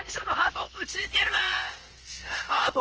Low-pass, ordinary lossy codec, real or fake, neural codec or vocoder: 7.2 kHz; Opus, 16 kbps; fake; codec, 16 kHz, about 1 kbps, DyCAST, with the encoder's durations